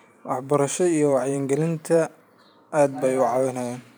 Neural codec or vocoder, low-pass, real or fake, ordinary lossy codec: vocoder, 44.1 kHz, 128 mel bands every 512 samples, BigVGAN v2; none; fake; none